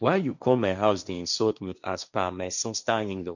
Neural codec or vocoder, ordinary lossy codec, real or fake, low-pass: codec, 16 kHz, 1.1 kbps, Voila-Tokenizer; none; fake; 7.2 kHz